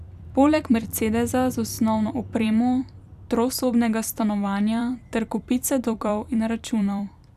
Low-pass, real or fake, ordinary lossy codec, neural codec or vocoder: 14.4 kHz; fake; none; vocoder, 44.1 kHz, 128 mel bands every 256 samples, BigVGAN v2